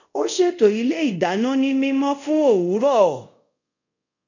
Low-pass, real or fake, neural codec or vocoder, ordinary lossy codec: 7.2 kHz; fake; codec, 24 kHz, 0.5 kbps, DualCodec; none